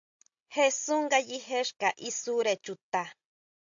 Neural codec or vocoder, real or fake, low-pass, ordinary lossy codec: none; real; 7.2 kHz; AAC, 64 kbps